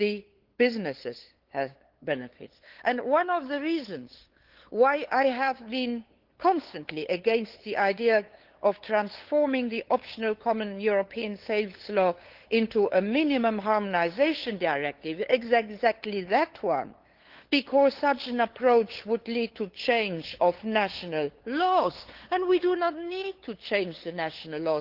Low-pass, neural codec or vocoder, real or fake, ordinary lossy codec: 5.4 kHz; codec, 16 kHz, 8 kbps, FunCodec, trained on LibriTTS, 25 frames a second; fake; Opus, 16 kbps